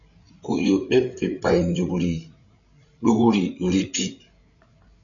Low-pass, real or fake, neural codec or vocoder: 7.2 kHz; fake; codec, 16 kHz, 8 kbps, FreqCodec, larger model